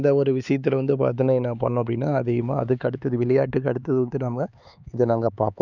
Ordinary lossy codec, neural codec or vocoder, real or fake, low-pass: none; codec, 16 kHz, 4 kbps, X-Codec, HuBERT features, trained on LibriSpeech; fake; 7.2 kHz